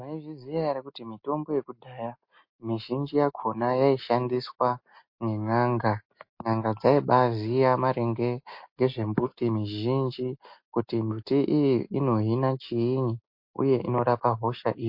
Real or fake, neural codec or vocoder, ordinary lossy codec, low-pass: real; none; MP3, 32 kbps; 5.4 kHz